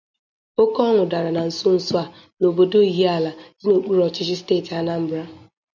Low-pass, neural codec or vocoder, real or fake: 7.2 kHz; none; real